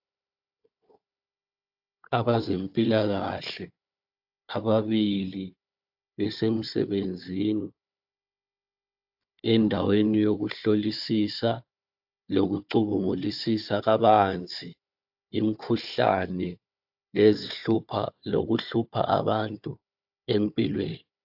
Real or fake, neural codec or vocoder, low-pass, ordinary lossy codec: fake; codec, 16 kHz, 4 kbps, FunCodec, trained on Chinese and English, 50 frames a second; 5.4 kHz; AAC, 48 kbps